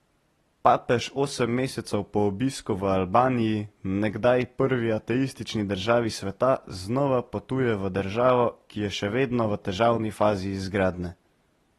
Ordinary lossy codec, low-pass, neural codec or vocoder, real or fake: AAC, 32 kbps; 19.8 kHz; none; real